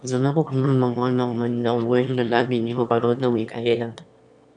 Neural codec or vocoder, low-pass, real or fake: autoencoder, 22.05 kHz, a latent of 192 numbers a frame, VITS, trained on one speaker; 9.9 kHz; fake